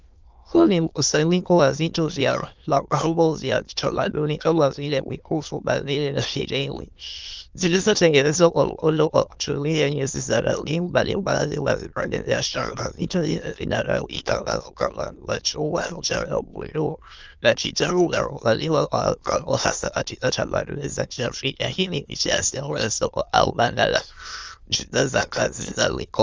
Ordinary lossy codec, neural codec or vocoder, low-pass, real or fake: Opus, 32 kbps; autoencoder, 22.05 kHz, a latent of 192 numbers a frame, VITS, trained on many speakers; 7.2 kHz; fake